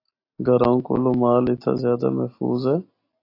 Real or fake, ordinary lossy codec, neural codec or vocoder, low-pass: real; AAC, 32 kbps; none; 5.4 kHz